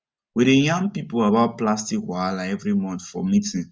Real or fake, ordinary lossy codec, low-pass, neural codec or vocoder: real; none; none; none